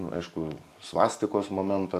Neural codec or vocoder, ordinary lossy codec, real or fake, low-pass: codec, 44.1 kHz, 7.8 kbps, DAC; MP3, 64 kbps; fake; 14.4 kHz